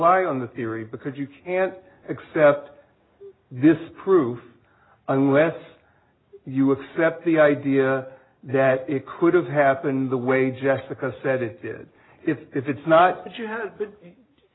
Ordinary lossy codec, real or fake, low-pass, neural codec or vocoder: AAC, 16 kbps; real; 7.2 kHz; none